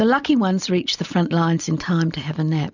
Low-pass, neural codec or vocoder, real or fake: 7.2 kHz; none; real